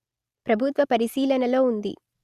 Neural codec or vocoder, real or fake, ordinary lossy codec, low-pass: none; real; Opus, 64 kbps; 14.4 kHz